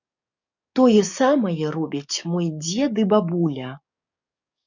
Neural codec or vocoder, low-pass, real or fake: codec, 44.1 kHz, 7.8 kbps, DAC; 7.2 kHz; fake